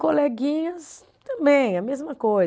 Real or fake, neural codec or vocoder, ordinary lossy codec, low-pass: real; none; none; none